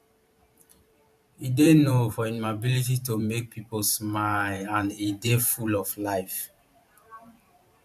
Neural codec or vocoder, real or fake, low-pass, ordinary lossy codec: vocoder, 44.1 kHz, 128 mel bands every 512 samples, BigVGAN v2; fake; 14.4 kHz; AAC, 96 kbps